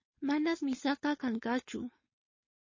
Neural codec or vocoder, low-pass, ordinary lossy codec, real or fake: codec, 16 kHz, 4.8 kbps, FACodec; 7.2 kHz; MP3, 32 kbps; fake